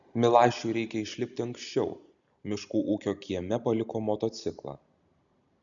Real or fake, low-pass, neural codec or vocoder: real; 7.2 kHz; none